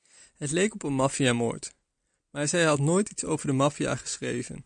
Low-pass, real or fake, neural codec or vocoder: 9.9 kHz; real; none